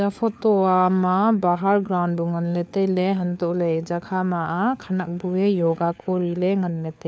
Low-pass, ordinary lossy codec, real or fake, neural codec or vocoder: none; none; fake; codec, 16 kHz, 4 kbps, FunCodec, trained on LibriTTS, 50 frames a second